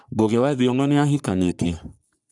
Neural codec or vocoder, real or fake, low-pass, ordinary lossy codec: codec, 44.1 kHz, 3.4 kbps, Pupu-Codec; fake; 10.8 kHz; none